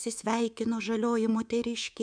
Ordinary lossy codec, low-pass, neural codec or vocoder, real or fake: MP3, 96 kbps; 9.9 kHz; codec, 24 kHz, 3.1 kbps, DualCodec; fake